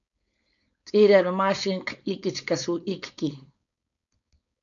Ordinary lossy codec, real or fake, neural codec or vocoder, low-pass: AAC, 64 kbps; fake; codec, 16 kHz, 4.8 kbps, FACodec; 7.2 kHz